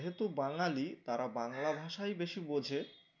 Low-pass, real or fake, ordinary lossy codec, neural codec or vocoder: 7.2 kHz; real; none; none